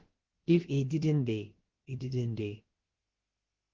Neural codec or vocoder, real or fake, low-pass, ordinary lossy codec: codec, 16 kHz, about 1 kbps, DyCAST, with the encoder's durations; fake; 7.2 kHz; Opus, 16 kbps